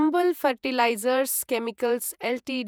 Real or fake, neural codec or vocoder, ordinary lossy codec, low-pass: fake; vocoder, 48 kHz, 128 mel bands, Vocos; none; none